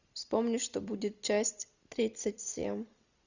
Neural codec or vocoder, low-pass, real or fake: vocoder, 44.1 kHz, 128 mel bands every 256 samples, BigVGAN v2; 7.2 kHz; fake